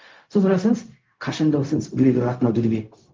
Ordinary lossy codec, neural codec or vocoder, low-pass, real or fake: Opus, 32 kbps; codec, 16 kHz, 0.4 kbps, LongCat-Audio-Codec; 7.2 kHz; fake